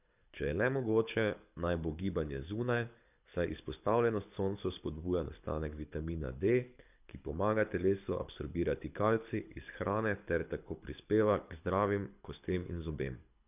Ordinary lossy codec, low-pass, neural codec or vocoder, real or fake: none; 3.6 kHz; codec, 24 kHz, 6 kbps, HILCodec; fake